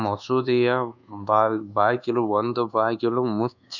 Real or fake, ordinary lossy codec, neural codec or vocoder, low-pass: fake; none; codec, 24 kHz, 1.2 kbps, DualCodec; 7.2 kHz